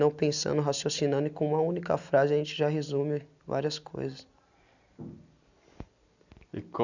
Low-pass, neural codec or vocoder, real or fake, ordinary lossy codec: 7.2 kHz; none; real; none